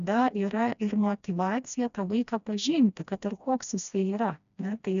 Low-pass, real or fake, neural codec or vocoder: 7.2 kHz; fake; codec, 16 kHz, 1 kbps, FreqCodec, smaller model